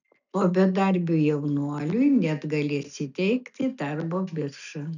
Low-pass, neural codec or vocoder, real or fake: 7.2 kHz; none; real